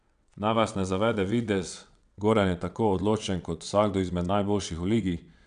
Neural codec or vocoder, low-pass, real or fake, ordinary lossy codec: vocoder, 22.05 kHz, 80 mel bands, WaveNeXt; 9.9 kHz; fake; none